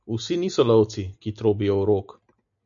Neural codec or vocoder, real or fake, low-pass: none; real; 7.2 kHz